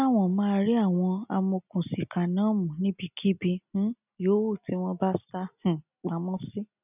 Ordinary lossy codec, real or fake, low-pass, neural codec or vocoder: none; real; 3.6 kHz; none